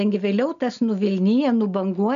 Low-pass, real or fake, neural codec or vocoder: 7.2 kHz; real; none